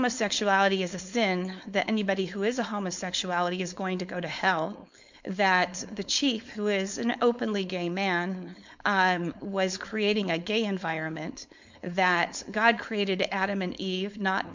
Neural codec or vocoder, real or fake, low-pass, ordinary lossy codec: codec, 16 kHz, 4.8 kbps, FACodec; fake; 7.2 kHz; MP3, 64 kbps